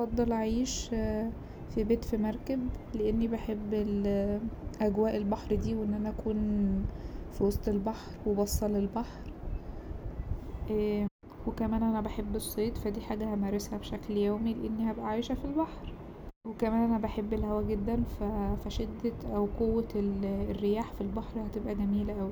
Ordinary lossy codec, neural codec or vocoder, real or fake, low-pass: none; none; real; 19.8 kHz